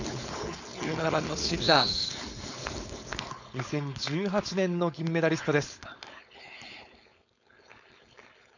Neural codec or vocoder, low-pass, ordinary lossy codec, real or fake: codec, 16 kHz, 4.8 kbps, FACodec; 7.2 kHz; none; fake